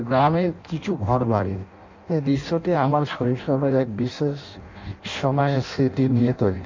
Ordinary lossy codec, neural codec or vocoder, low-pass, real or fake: MP3, 48 kbps; codec, 16 kHz in and 24 kHz out, 0.6 kbps, FireRedTTS-2 codec; 7.2 kHz; fake